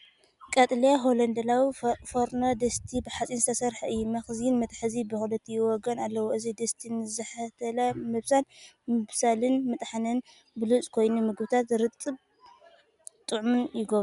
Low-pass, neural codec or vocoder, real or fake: 10.8 kHz; none; real